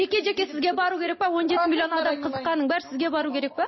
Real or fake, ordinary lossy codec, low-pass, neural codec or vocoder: real; MP3, 24 kbps; 7.2 kHz; none